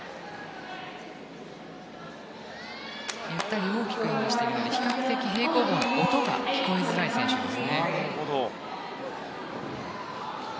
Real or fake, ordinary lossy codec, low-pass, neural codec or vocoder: real; none; none; none